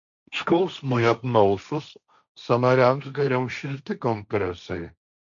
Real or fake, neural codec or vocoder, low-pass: fake; codec, 16 kHz, 1.1 kbps, Voila-Tokenizer; 7.2 kHz